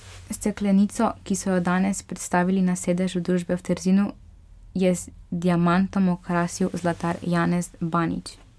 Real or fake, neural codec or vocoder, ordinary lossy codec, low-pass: real; none; none; none